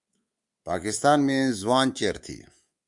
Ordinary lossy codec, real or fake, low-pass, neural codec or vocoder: Opus, 64 kbps; fake; 10.8 kHz; codec, 24 kHz, 3.1 kbps, DualCodec